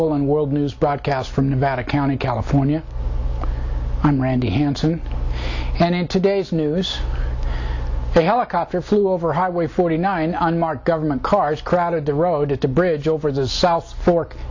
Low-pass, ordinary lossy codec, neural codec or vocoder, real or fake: 7.2 kHz; AAC, 48 kbps; none; real